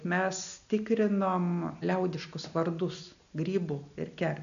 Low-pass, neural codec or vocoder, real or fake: 7.2 kHz; none; real